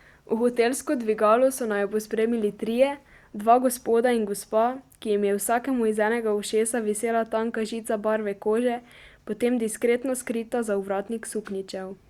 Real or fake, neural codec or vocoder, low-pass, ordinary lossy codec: real; none; 19.8 kHz; Opus, 64 kbps